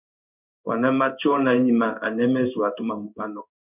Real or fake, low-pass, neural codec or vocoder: fake; 3.6 kHz; codec, 16 kHz in and 24 kHz out, 1 kbps, XY-Tokenizer